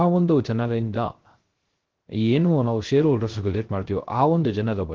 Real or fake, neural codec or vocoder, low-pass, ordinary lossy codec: fake; codec, 16 kHz, 0.3 kbps, FocalCodec; 7.2 kHz; Opus, 16 kbps